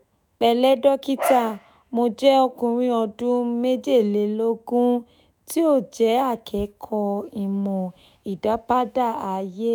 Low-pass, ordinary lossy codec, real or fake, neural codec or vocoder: none; none; fake; autoencoder, 48 kHz, 128 numbers a frame, DAC-VAE, trained on Japanese speech